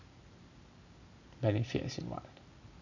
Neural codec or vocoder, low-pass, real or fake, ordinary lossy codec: none; 7.2 kHz; real; none